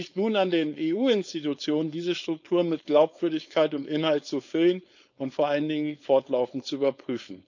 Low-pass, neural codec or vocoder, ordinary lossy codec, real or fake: 7.2 kHz; codec, 16 kHz, 4.8 kbps, FACodec; none; fake